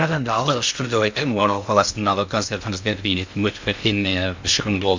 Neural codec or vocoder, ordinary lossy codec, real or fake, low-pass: codec, 16 kHz in and 24 kHz out, 0.6 kbps, FocalCodec, streaming, 2048 codes; MP3, 64 kbps; fake; 7.2 kHz